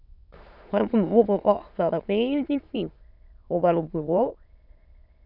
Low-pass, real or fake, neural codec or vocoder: 5.4 kHz; fake; autoencoder, 22.05 kHz, a latent of 192 numbers a frame, VITS, trained on many speakers